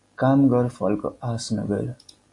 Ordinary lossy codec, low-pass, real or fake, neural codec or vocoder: AAC, 48 kbps; 10.8 kHz; real; none